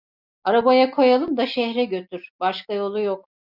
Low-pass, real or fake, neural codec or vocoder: 5.4 kHz; real; none